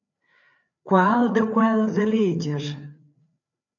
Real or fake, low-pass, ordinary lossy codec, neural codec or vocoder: fake; 7.2 kHz; AAC, 64 kbps; codec, 16 kHz, 4 kbps, FreqCodec, larger model